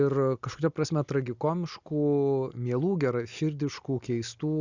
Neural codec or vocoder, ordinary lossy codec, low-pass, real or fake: none; Opus, 64 kbps; 7.2 kHz; real